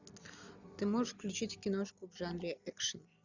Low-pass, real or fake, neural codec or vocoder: 7.2 kHz; real; none